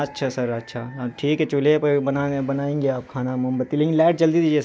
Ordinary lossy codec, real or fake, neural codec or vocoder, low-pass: none; real; none; none